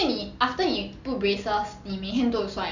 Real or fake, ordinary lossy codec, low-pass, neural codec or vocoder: real; none; 7.2 kHz; none